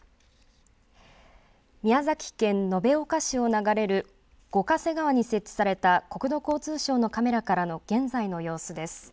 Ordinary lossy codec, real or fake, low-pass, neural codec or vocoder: none; real; none; none